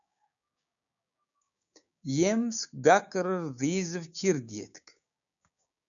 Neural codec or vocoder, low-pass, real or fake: codec, 16 kHz, 6 kbps, DAC; 7.2 kHz; fake